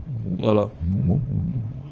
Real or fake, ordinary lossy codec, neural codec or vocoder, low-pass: fake; Opus, 24 kbps; codec, 24 kHz, 0.9 kbps, WavTokenizer, small release; 7.2 kHz